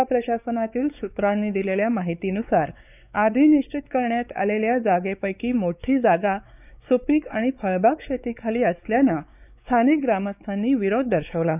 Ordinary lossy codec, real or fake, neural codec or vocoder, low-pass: none; fake; codec, 16 kHz, 4 kbps, X-Codec, WavLM features, trained on Multilingual LibriSpeech; 3.6 kHz